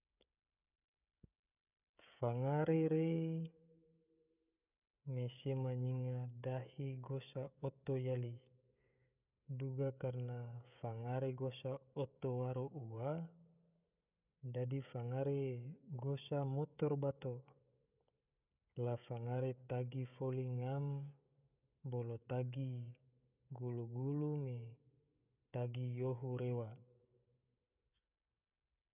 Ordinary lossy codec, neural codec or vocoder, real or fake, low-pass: none; codec, 16 kHz, 16 kbps, FreqCodec, smaller model; fake; 3.6 kHz